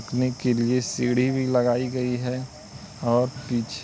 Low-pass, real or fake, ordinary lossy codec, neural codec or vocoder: none; real; none; none